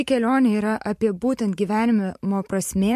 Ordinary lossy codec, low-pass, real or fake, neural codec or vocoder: MP3, 64 kbps; 14.4 kHz; fake; vocoder, 44.1 kHz, 128 mel bands every 512 samples, BigVGAN v2